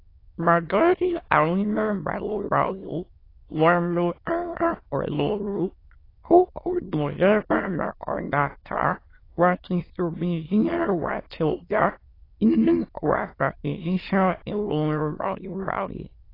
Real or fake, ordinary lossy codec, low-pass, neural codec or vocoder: fake; AAC, 24 kbps; 5.4 kHz; autoencoder, 22.05 kHz, a latent of 192 numbers a frame, VITS, trained on many speakers